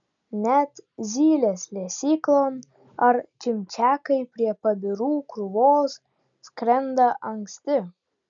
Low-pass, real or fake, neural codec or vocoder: 7.2 kHz; real; none